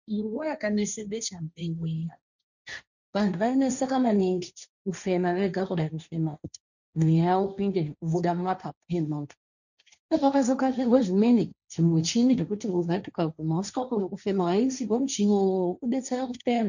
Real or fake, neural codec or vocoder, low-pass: fake; codec, 16 kHz, 1.1 kbps, Voila-Tokenizer; 7.2 kHz